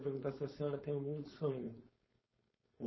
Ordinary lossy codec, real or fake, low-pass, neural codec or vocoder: MP3, 24 kbps; fake; 7.2 kHz; codec, 16 kHz, 4.8 kbps, FACodec